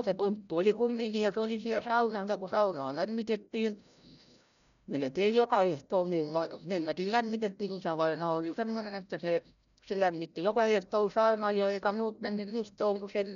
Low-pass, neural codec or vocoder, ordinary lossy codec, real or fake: 7.2 kHz; codec, 16 kHz, 0.5 kbps, FreqCodec, larger model; none; fake